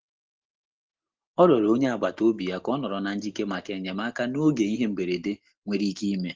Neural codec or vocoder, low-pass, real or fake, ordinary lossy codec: none; 7.2 kHz; real; Opus, 16 kbps